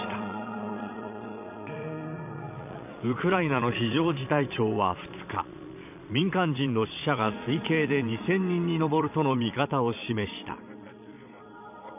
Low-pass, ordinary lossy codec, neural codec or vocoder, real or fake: 3.6 kHz; none; vocoder, 22.05 kHz, 80 mel bands, Vocos; fake